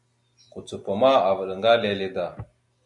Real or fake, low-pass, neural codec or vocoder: real; 10.8 kHz; none